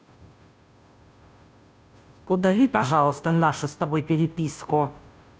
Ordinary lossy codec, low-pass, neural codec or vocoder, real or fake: none; none; codec, 16 kHz, 0.5 kbps, FunCodec, trained on Chinese and English, 25 frames a second; fake